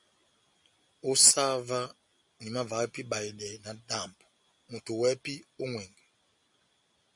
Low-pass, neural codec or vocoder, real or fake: 10.8 kHz; none; real